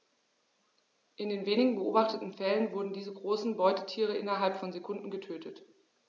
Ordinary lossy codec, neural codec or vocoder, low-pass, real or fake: none; none; 7.2 kHz; real